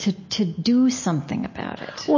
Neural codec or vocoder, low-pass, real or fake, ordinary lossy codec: none; 7.2 kHz; real; MP3, 32 kbps